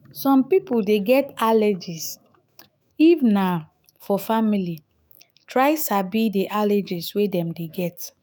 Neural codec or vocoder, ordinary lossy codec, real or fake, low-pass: autoencoder, 48 kHz, 128 numbers a frame, DAC-VAE, trained on Japanese speech; none; fake; none